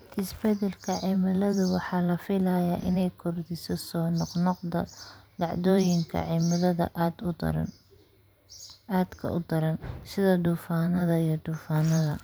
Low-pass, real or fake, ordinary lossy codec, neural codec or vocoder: none; fake; none; vocoder, 44.1 kHz, 128 mel bands every 512 samples, BigVGAN v2